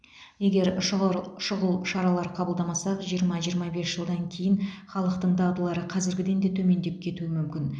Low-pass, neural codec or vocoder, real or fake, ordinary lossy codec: 9.9 kHz; none; real; none